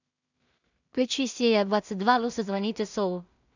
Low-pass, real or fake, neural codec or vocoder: 7.2 kHz; fake; codec, 16 kHz in and 24 kHz out, 0.4 kbps, LongCat-Audio-Codec, two codebook decoder